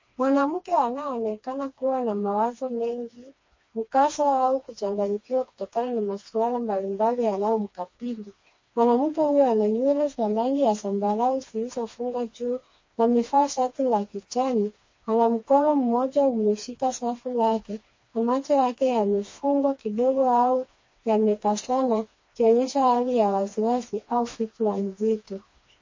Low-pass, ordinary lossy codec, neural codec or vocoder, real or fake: 7.2 kHz; MP3, 32 kbps; codec, 16 kHz, 2 kbps, FreqCodec, smaller model; fake